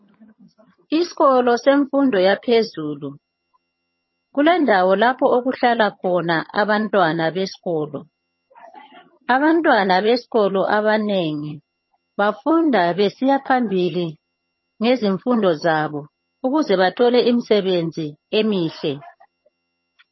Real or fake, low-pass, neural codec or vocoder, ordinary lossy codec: fake; 7.2 kHz; vocoder, 22.05 kHz, 80 mel bands, HiFi-GAN; MP3, 24 kbps